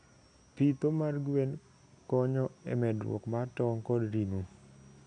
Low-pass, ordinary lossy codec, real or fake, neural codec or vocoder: 9.9 kHz; none; real; none